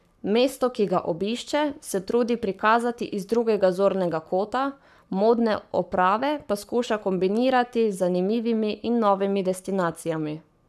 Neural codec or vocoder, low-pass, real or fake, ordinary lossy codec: codec, 44.1 kHz, 7.8 kbps, Pupu-Codec; 14.4 kHz; fake; none